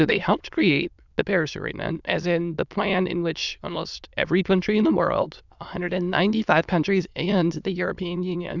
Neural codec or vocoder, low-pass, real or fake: autoencoder, 22.05 kHz, a latent of 192 numbers a frame, VITS, trained on many speakers; 7.2 kHz; fake